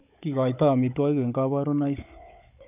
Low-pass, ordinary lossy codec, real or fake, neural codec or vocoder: 3.6 kHz; none; fake; codec, 16 kHz, 16 kbps, FunCodec, trained on Chinese and English, 50 frames a second